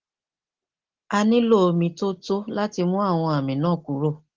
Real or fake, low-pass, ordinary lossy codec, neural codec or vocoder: real; 7.2 kHz; Opus, 16 kbps; none